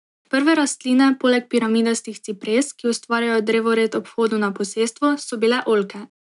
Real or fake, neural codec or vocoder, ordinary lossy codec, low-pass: real; none; none; 10.8 kHz